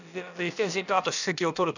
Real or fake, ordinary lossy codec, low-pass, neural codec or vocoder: fake; none; 7.2 kHz; codec, 16 kHz, about 1 kbps, DyCAST, with the encoder's durations